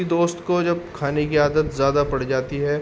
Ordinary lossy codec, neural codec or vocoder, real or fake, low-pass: none; none; real; none